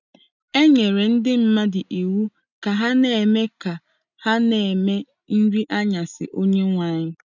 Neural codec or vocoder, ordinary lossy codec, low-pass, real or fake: none; none; 7.2 kHz; real